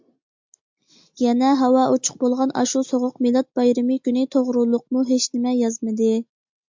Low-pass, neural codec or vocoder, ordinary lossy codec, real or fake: 7.2 kHz; none; MP3, 64 kbps; real